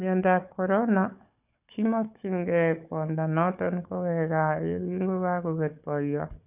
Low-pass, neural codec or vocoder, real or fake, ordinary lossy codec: 3.6 kHz; codec, 16 kHz, 4 kbps, FunCodec, trained on Chinese and English, 50 frames a second; fake; none